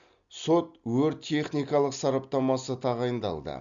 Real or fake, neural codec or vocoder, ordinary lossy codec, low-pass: real; none; Opus, 64 kbps; 7.2 kHz